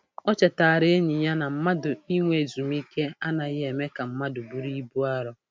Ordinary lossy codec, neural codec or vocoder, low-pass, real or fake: none; none; none; real